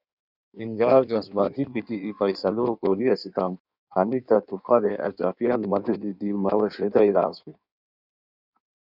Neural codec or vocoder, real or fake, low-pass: codec, 16 kHz in and 24 kHz out, 1.1 kbps, FireRedTTS-2 codec; fake; 5.4 kHz